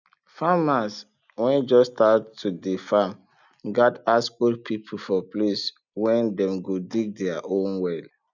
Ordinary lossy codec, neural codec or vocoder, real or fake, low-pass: none; none; real; 7.2 kHz